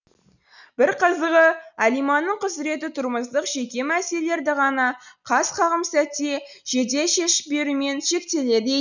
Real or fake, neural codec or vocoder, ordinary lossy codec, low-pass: real; none; none; 7.2 kHz